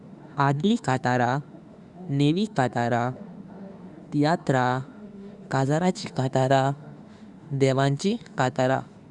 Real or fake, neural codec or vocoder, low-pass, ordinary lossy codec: fake; autoencoder, 48 kHz, 32 numbers a frame, DAC-VAE, trained on Japanese speech; 10.8 kHz; Opus, 64 kbps